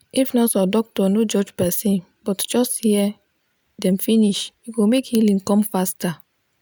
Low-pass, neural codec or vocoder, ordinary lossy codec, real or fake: none; none; none; real